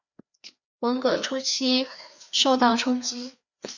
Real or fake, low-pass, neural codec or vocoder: fake; 7.2 kHz; codec, 16 kHz, 2 kbps, FreqCodec, larger model